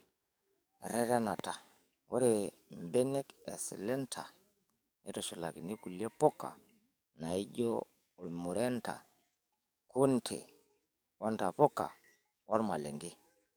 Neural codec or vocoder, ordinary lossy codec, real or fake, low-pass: codec, 44.1 kHz, 7.8 kbps, DAC; none; fake; none